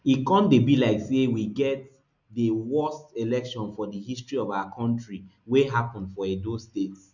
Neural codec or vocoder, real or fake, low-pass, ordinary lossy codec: none; real; 7.2 kHz; none